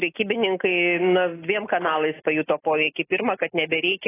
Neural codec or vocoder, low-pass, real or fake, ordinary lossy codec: none; 3.6 kHz; real; AAC, 16 kbps